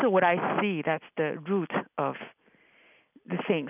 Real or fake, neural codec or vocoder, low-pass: real; none; 3.6 kHz